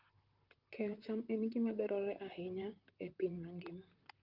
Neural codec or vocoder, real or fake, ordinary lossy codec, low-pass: vocoder, 44.1 kHz, 128 mel bands, Pupu-Vocoder; fake; Opus, 24 kbps; 5.4 kHz